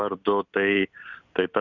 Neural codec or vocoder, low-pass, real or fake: none; 7.2 kHz; real